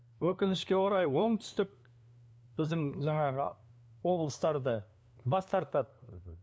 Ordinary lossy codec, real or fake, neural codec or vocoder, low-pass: none; fake; codec, 16 kHz, 2 kbps, FunCodec, trained on LibriTTS, 25 frames a second; none